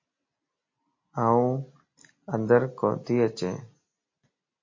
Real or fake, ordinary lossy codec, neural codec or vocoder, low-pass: real; MP3, 32 kbps; none; 7.2 kHz